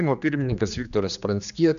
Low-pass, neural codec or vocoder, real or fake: 7.2 kHz; codec, 16 kHz, 2 kbps, X-Codec, HuBERT features, trained on general audio; fake